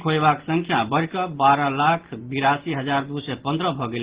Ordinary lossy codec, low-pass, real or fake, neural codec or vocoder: Opus, 16 kbps; 3.6 kHz; real; none